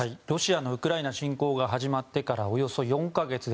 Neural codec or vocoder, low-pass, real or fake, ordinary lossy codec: none; none; real; none